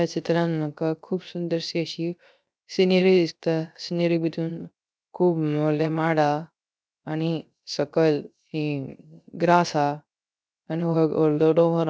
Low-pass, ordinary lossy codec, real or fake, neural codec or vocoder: none; none; fake; codec, 16 kHz, 0.3 kbps, FocalCodec